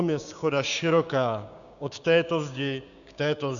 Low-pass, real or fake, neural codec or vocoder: 7.2 kHz; fake; codec, 16 kHz, 6 kbps, DAC